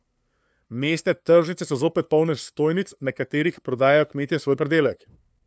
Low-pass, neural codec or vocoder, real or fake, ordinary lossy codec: none; codec, 16 kHz, 2 kbps, FunCodec, trained on LibriTTS, 25 frames a second; fake; none